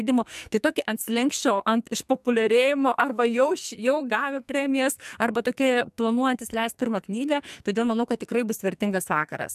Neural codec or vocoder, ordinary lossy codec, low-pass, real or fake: codec, 44.1 kHz, 2.6 kbps, SNAC; MP3, 96 kbps; 14.4 kHz; fake